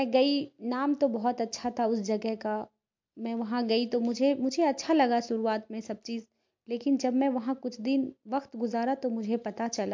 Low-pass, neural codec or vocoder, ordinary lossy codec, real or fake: 7.2 kHz; none; MP3, 48 kbps; real